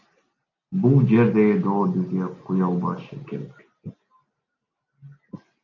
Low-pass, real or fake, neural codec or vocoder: 7.2 kHz; real; none